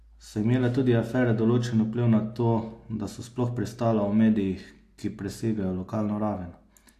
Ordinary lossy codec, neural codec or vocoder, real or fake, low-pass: AAC, 64 kbps; none; real; 14.4 kHz